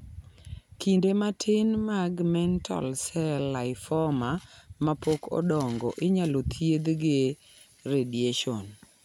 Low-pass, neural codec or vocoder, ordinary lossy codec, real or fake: 19.8 kHz; none; none; real